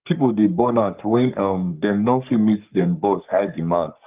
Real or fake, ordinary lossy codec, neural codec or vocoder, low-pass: fake; Opus, 24 kbps; codec, 44.1 kHz, 3.4 kbps, Pupu-Codec; 3.6 kHz